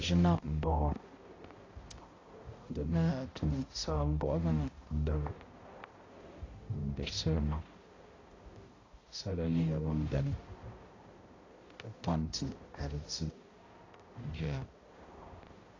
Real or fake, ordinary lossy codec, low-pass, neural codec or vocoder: fake; AAC, 32 kbps; 7.2 kHz; codec, 16 kHz, 0.5 kbps, X-Codec, HuBERT features, trained on balanced general audio